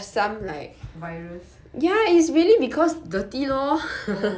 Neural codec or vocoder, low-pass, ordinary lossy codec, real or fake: none; none; none; real